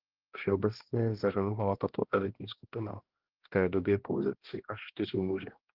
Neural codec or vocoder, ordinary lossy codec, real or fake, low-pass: codec, 16 kHz, 1 kbps, X-Codec, HuBERT features, trained on balanced general audio; Opus, 16 kbps; fake; 5.4 kHz